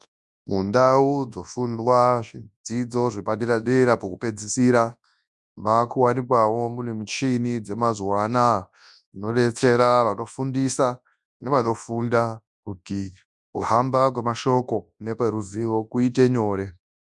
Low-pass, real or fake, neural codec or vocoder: 10.8 kHz; fake; codec, 24 kHz, 0.9 kbps, WavTokenizer, large speech release